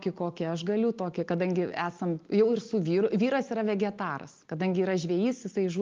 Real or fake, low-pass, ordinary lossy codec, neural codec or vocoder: real; 7.2 kHz; Opus, 32 kbps; none